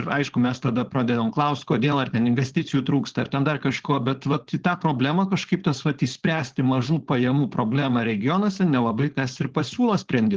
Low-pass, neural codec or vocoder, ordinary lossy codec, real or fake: 7.2 kHz; codec, 16 kHz, 4.8 kbps, FACodec; Opus, 16 kbps; fake